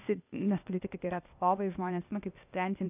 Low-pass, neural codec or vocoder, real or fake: 3.6 kHz; codec, 16 kHz, 0.8 kbps, ZipCodec; fake